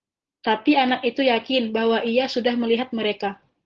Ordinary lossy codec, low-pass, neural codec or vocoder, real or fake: Opus, 16 kbps; 7.2 kHz; none; real